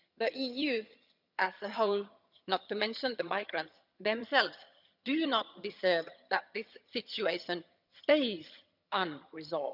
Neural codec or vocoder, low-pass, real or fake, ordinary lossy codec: vocoder, 22.05 kHz, 80 mel bands, HiFi-GAN; 5.4 kHz; fake; none